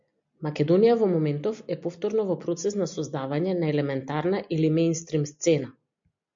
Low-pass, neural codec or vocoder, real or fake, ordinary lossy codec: 7.2 kHz; none; real; MP3, 64 kbps